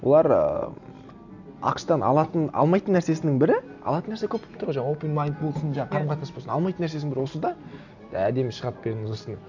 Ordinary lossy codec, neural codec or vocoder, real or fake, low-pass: none; none; real; 7.2 kHz